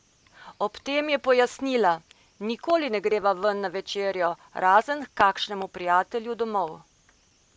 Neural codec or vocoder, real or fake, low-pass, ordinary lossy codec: none; real; none; none